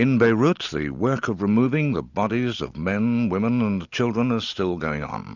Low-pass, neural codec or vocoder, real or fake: 7.2 kHz; none; real